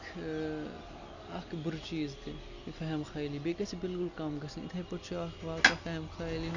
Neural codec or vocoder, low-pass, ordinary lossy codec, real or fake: none; 7.2 kHz; none; real